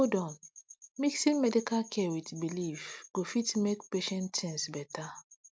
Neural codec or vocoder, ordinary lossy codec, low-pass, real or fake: none; none; none; real